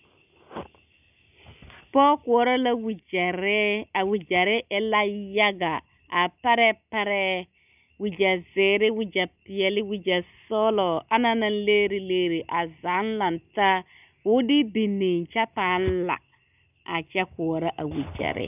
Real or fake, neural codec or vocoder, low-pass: real; none; 3.6 kHz